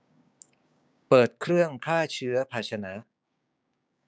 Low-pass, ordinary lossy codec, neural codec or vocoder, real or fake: none; none; codec, 16 kHz, 6 kbps, DAC; fake